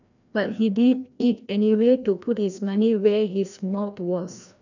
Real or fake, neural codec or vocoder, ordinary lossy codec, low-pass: fake; codec, 16 kHz, 1 kbps, FreqCodec, larger model; none; 7.2 kHz